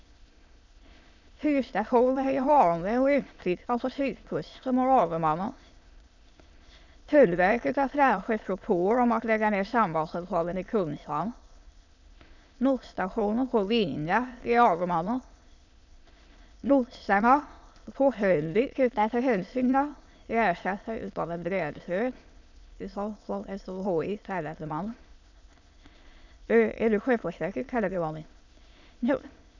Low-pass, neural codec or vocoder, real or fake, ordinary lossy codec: 7.2 kHz; autoencoder, 22.05 kHz, a latent of 192 numbers a frame, VITS, trained on many speakers; fake; none